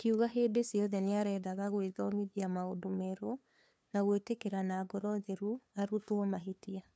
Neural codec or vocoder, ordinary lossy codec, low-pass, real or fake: codec, 16 kHz, 2 kbps, FunCodec, trained on LibriTTS, 25 frames a second; none; none; fake